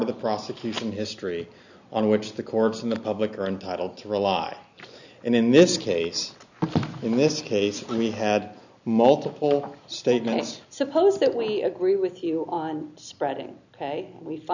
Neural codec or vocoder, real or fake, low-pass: none; real; 7.2 kHz